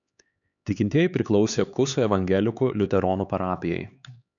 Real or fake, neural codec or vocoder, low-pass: fake; codec, 16 kHz, 4 kbps, X-Codec, HuBERT features, trained on LibriSpeech; 7.2 kHz